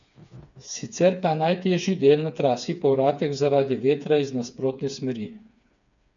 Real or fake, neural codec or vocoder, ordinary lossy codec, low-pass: fake; codec, 16 kHz, 4 kbps, FreqCodec, smaller model; none; 7.2 kHz